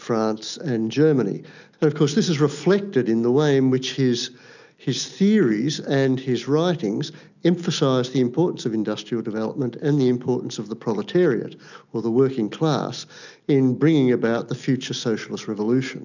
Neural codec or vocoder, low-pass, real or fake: none; 7.2 kHz; real